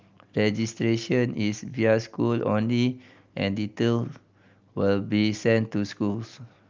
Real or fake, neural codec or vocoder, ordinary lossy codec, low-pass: real; none; Opus, 32 kbps; 7.2 kHz